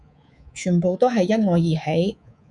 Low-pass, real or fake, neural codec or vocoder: 10.8 kHz; fake; codec, 24 kHz, 3.1 kbps, DualCodec